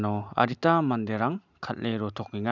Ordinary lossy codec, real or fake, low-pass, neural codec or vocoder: none; real; 7.2 kHz; none